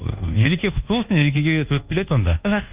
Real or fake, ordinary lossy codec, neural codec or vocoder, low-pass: fake; Opus, 24 kbps; codec, 24 kHz, 1.2 kbps, DualCodec; 3.6 kHz